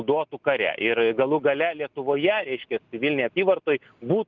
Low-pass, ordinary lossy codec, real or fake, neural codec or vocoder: 7.2 kHz; Opus, 24 kbps; real; none